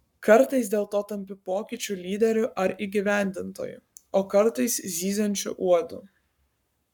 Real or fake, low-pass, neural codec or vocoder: fake; 19.8 kHz; codec, 44.1 kHz, 7.8 kbps, Pupu-Codec